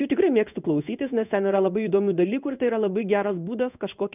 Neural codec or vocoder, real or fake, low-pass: codec, 16 kHz in and 24 kHz out, 1 kbps, XY-Tokenizer; fake; 3.6 kHz